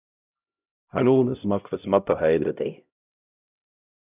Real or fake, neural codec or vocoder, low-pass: fake; codec, 16 kHz, 0.5 kbps, X-Codec, HuBERT features, trained on LibriSpeech; 3.6 kHz